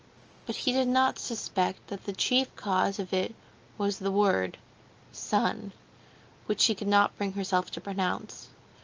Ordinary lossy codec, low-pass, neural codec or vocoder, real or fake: Opus, 24 kbps; 7.2 kHz; none; real